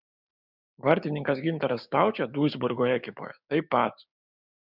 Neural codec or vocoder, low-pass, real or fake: codec, 16 kHz, 6 kbps, DAC; 5.4 kHz; fake